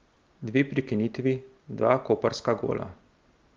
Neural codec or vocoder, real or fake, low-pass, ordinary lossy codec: none; real; 7.2 kHz; Opus, 32 kbps